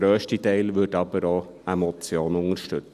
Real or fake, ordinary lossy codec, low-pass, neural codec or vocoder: real; none; 14.4 kHz; none